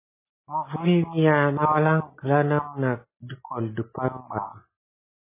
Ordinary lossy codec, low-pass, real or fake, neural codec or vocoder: MP3, 16 kbps; 3.6 kHz; real; none